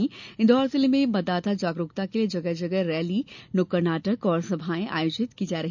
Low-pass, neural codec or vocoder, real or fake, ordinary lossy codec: 7.2 kHz; none; real; none